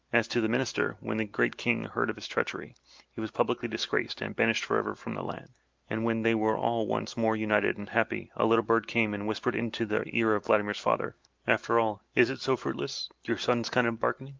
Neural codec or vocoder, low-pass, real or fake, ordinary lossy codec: none; 7.2 kHz; real; Opus, 24 kbps